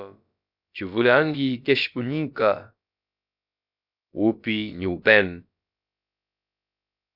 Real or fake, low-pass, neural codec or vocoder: fake; 5.4 kHz; codec, 16 kHz, about 1 kbps, DyCAST, with the encoder's durations